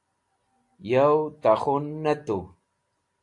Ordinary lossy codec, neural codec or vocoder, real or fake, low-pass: AAC, 48 kbps; none; real; 10.8 kHz